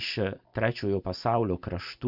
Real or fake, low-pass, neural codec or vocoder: real; 5.4 kHz; none